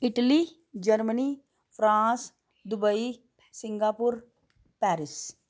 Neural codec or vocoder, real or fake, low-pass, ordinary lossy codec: none; real; none; none